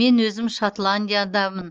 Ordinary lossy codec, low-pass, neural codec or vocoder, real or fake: Opus, 24 kbps; 7.2 kHz; none; real